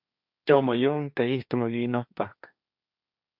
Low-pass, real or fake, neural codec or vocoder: 5.4 kHz; fake; codec, 16 kHz, 1.1 kbps, Voila-Tokenizer